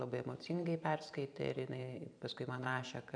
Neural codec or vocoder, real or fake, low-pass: vocoder, 44.1 kHz, 128 mel bands every 512 samples, BigVGAN v2; fake; 9.9 kHz